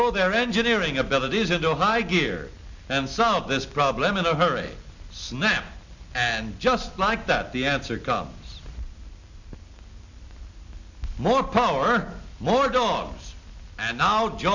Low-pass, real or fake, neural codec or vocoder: 7.2 kHz; real; none